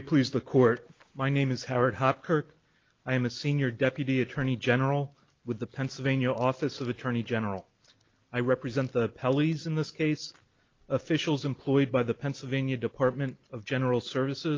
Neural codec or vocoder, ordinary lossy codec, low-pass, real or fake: none; Opus, 32 kbps; 7.2 kHz; real